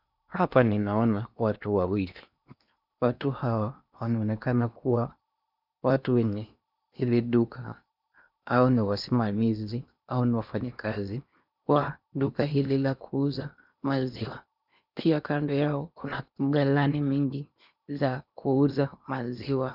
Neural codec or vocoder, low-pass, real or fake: codec, 16 kHz in and 24 kHz out, 0.8 kbps, FocalCodec, streaming, 65536 codes; 5.4 kHz; fake